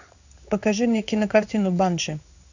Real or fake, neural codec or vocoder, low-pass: fake; codec, 16 kHz in and 24 kHz out, 1 kbps, XY-Tokenizer; 7.2 kHz